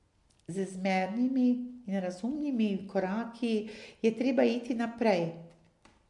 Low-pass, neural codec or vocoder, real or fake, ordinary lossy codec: 10.8 kHz; none; real; MP3, 64 kbps